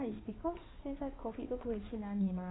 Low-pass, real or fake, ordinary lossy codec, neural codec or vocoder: 7.2 kHz; fake; AAC, 16 kbps; codec, 16 kHz in and 24 kHz out, 2.2 kbps, FireRedTTS-2 codec